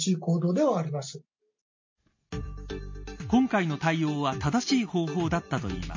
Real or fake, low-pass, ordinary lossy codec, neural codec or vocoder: real; 7.2 kHz; MP3, 32 kbps; none